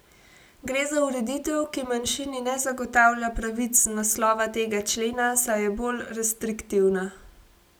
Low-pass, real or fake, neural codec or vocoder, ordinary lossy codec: none; real; none; none